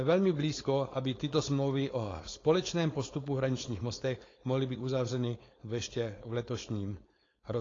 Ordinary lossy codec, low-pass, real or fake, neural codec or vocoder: AAC, 32 kbps; 7.2 kHz; fake; codec, 16 kHz, 4.8 kbps, FACodec